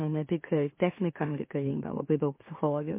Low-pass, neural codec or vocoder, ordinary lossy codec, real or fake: 3.6 kHz; autoencoder, 44.1 kHz, a latent of 192 numbers a frame, MeloTTS; MP3, 24 kbps; fake